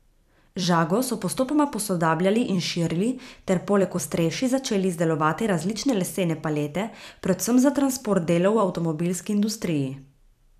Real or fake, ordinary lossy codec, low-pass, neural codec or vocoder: fake; none; 14.4 kHz; vocoder, 44.1 kHz, 128 mel bands every 256 samples, BigVGAN v2